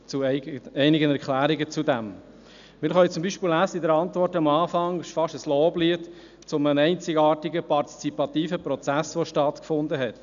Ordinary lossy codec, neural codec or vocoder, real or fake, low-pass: none; none; real; 7.2 kHz